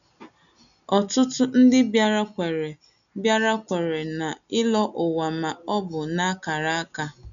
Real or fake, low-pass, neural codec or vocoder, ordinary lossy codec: real; 7.2 kHz; none; none